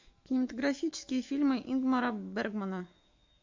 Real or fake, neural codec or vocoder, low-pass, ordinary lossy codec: fake; autoencoder, 48 kHz, 128 numbers a frame, DAC-VAE, trained on Japanese speech; 7.2 kHz; MP3, 48 kbps